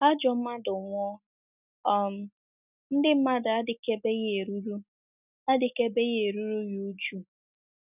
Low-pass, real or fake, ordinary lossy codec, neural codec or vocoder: 3.6 kHz; real; none; none